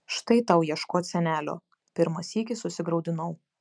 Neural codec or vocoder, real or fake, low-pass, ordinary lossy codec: none; real; 9.9 kHz; MP3, 96 kbps